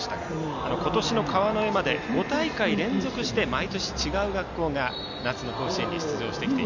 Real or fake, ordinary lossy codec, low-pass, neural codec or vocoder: real; none; 7.2 kHz; none